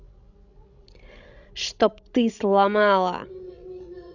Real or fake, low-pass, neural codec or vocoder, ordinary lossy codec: fake; 7.2 kHz; codec, 16 kHz, 16 kbps, FreqCodec, larger model; none